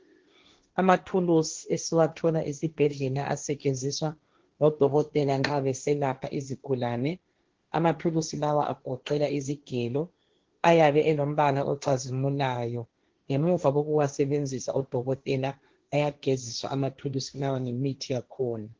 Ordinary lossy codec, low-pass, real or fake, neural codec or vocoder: Opus, 16 kbps; 7.2 kHz; fake; codec, 16 kHz, 1.1 kbps, Voila-Tokenizer